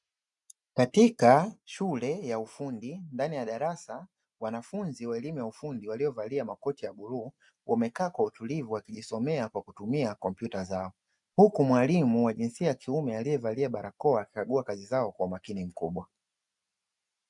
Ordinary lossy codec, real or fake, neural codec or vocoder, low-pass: MP3, 96 kbps; real; none; 10.8 kHz